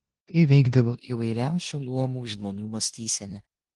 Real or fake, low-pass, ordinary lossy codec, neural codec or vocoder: fake; 10.8 kHz; Opus, 24 kbps; codec, 16 kHz in and 24 kHz out, 0.9 kbps, LongCat-Audio-Codec, four codebook decoder